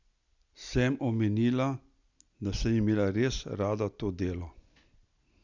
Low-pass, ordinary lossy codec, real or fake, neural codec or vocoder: 7.2 kHz; Opus, 64 kbps; real; none